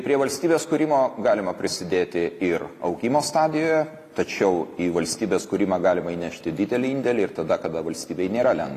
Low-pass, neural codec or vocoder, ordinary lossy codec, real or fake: 14.4 kHz; none; AAC, 48 kbps; real